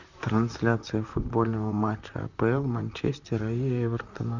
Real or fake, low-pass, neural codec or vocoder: fake; 7.2 kHz; vocoder, 44.1 kHz, 128 mel bands, Pupu-Vocoder